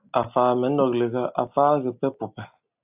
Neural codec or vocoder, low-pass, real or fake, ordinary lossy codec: none; 3.6 kHz; real; AAC, 32 kbps